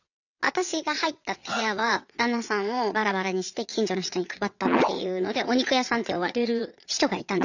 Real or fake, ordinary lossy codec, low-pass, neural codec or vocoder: fake; none; 7.2 kHz; vocoder, 22.05 kHz, 80 mel bands, WaveNeXt